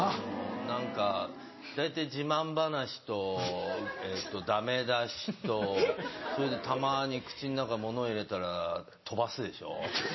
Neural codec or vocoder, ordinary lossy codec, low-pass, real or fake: none; MP3, 24 kbps; 7.2 kHz; real